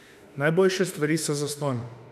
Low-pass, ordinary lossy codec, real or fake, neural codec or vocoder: 14.4 kHz; none; fake; autoencoder, 48 kHz, 32 numbers a frame, DAC-VAE, trained on Japanese speech